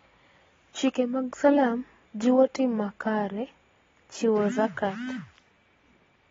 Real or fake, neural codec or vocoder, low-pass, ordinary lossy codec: real; none; 7.2 kHz; AAC, 24 kbps